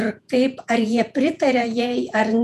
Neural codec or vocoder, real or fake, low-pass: vocoder, 48 kHz, 128 mel bands, Vocos; fake; 14.4 kHz